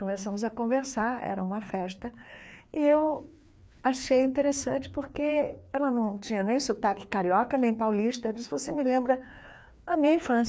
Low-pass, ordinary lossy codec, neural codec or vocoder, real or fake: none; none; codec, 16 kHz, 2 kbps, FreqCodec, larger model; fake